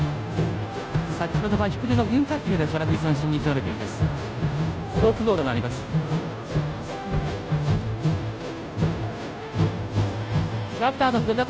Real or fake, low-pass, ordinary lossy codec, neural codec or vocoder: fake; none; none; codec, 16 kHz, 0.5 kbps, FunCodec, trained on Chinese and English, 25 frames a second